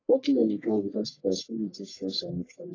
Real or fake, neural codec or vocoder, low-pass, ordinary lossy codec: fake; codec, 44.1 kHz, 1.7 kbps, Pupu-Codec; 7.2 kHz; AAC, 32 kbps